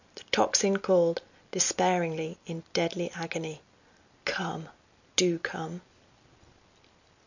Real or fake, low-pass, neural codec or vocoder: real; 7.2 kHz; none